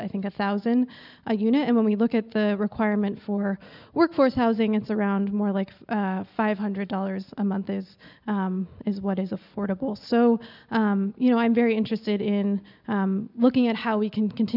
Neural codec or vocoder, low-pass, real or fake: none; 5.4 kHz; real